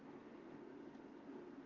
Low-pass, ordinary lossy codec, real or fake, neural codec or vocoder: 7.2 kHz; Opus, 24 kbps; real; none